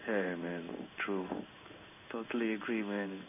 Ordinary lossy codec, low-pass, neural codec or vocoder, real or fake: none; 3.6 kHz; codec, 16 kHz in and 24 kHz out, 1 kbps, XY-Tokenizer; fake